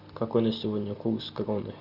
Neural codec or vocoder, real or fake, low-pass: none; real; 5.4 kHz